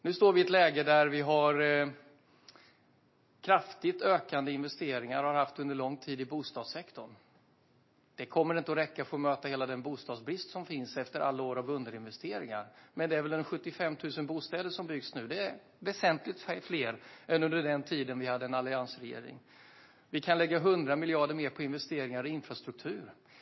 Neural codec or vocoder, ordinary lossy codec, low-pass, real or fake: none; MP3, 24 kbps; 7.2 kHz; real